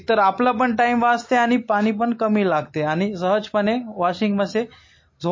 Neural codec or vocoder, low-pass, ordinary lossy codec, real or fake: none; 7.2 kHz; MP3, 32 kbps; real